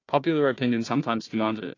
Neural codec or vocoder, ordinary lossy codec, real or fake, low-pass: codec, 16 kHz, 1 kbps, FunCodec, trained on Chinese and English, 50 frames a second; AAC, 32 kbps; fake; 7.2 kHz